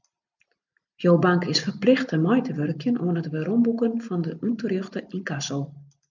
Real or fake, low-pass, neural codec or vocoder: real; 7.2 kHz; none